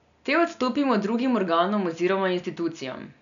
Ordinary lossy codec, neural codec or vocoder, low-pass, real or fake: none; none; 7.2 kHz; real